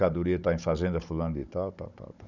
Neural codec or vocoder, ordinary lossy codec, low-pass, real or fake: codec, 16 kHz, 16 kbps, FunCodec, trained on Chinese and English, 50 frames a second; none; 7.2 kHz; fake